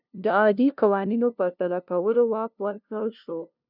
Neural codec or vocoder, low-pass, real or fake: codec, 16 kHz, 0.5 kbps, FunCodec, trained on LibriTTS, 25 frames a second; 5.4 kHz; fake